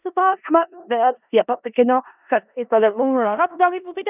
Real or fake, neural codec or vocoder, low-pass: fake; codec, 16 kHz in and 24 kHz out, 0.4 kbps, LongCat-Audio-Codec, four codebook decoder; 3.6 kHz